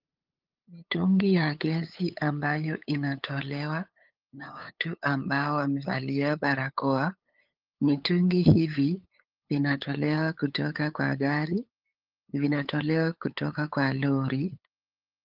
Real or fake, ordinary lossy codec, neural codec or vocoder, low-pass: fake; Opus, 24 kbps; codec, 16 kHz, 8 kbps, FunCodec, trained on LibriTTS, 25 frames a second; 5.4 kHz